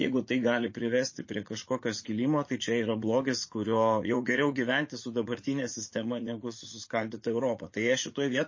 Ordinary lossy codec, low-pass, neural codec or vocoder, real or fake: MP3, 32 kbps; 7.2 kHz; vocoder, 44.1 kHz, 80 mel bands, Vocos; fake